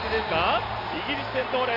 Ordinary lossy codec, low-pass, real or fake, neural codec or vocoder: Opus, 64 kbps; 5.4 kHz; real; none